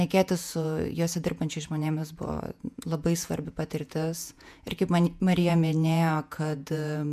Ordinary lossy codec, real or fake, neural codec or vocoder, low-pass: MP3, 96 kbps; fake; vocoder, 48 kHz, 128 mel bands, Vocos; 14.4 kHz